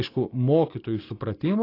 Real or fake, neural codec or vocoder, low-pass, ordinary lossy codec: real; none; 5.4 kHz; AAC, 24 kbps